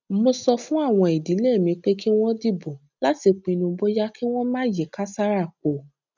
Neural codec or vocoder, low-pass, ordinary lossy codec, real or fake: none; 7.2 kHz; none; real